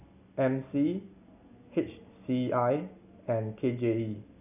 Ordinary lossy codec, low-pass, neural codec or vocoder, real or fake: none; 3.6 kHz; none; real